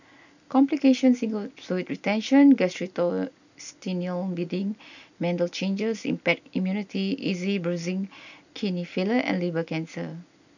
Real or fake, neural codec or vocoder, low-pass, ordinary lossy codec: real; none; 7.2 kHz; none